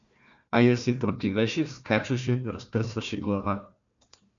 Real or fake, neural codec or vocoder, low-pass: fake; codec, 16 kHz, 1 kbps, FunCodec, trained on Chinese and English, 50 frames a second; 7.2 kHz